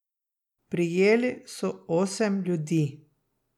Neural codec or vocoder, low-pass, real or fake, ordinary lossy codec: none; 19.8 kHz; real; none